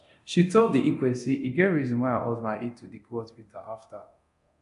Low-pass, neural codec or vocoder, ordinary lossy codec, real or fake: 10.8 kHz; codec, 24 kHz, 0.9 kbps, DualCodec; AAC, 96 kbps; fake